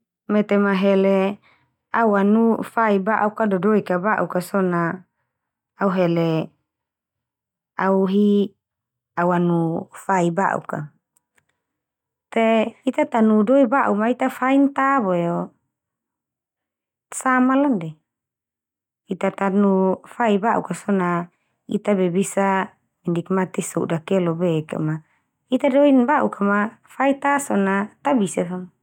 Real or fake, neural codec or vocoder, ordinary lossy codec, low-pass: real; none; none; 19.8 kHz